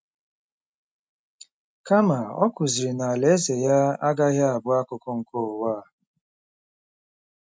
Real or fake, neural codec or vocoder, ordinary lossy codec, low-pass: real; none; none; none